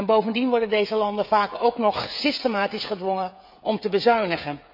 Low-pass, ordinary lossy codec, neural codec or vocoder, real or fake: 5.4 kHz; none; codec, 16 kHz, 16 kbps, FreqCodec, smaller model; fake